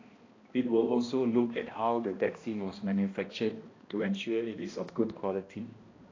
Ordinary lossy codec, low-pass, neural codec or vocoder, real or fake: AAC, 32 kbps; 7.2 kHz; codec, 16 kHz, 1 kbps, X-Codec, HuBERT features, trained on balanced general audio; fake